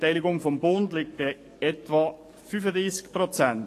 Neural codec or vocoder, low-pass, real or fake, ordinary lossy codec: codec, 44.1 kHz, 7.8 kbps, DAC; 14.4 kHz; fake; AAC, 48 kbps